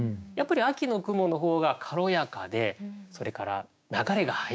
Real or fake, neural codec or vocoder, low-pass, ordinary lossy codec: fake; codec, 16 kHz, 6 kbps, DAC; none; none